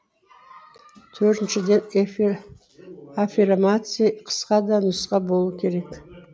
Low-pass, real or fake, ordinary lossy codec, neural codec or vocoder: none; real; none; none